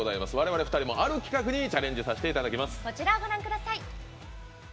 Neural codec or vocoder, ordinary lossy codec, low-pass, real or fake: none; none; none; real